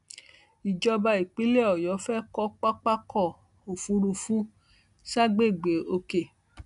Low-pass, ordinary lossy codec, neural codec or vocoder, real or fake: 10.8 kHz; MP3, 96 kbps; none; real